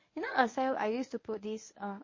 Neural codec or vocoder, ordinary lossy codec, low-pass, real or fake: codec, 24 kHz, 0.9 kbps, WavTokenizer, medium speech release version 1; MP3, 32 kbps; 7.2 kHz; fake